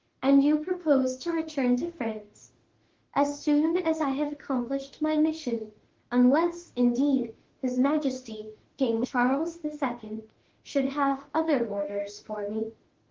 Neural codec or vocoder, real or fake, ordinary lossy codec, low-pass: autoencoder, 48 kHz, 32 numbers a frame, DAC-VAE, trained on Japanese speech; fake; Opus, 16 kbps; 7.2 kHz